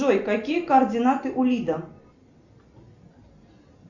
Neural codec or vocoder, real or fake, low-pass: none; real; 7.2 kHz